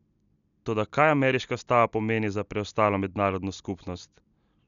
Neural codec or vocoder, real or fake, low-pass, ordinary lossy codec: none; real; 7.2 kHz; none